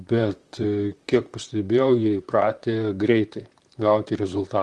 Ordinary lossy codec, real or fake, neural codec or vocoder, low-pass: Opus, 24 kbps; fake; vocoder, 44.1 kHz, 128 mel bands, Pupu-Vocoder; 10.8 kHz